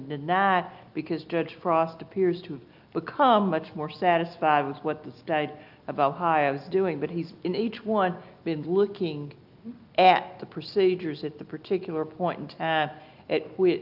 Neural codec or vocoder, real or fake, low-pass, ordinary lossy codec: none; real; 5.4 kHz; Opus, 24 kbps